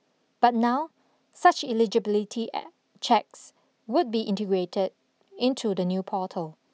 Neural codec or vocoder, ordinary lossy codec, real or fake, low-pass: none; none; real; none